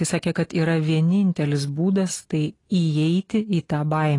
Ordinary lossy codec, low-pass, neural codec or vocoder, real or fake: AAC, 32 kbps; 10.8 kHz; none; real